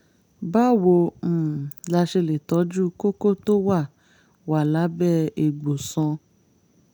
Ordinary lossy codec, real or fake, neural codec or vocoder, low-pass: none; real; none; none